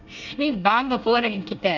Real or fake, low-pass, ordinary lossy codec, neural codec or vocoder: fake; 7.2 kHz; none; codec, 24 kHz, 1 kbps, SNAC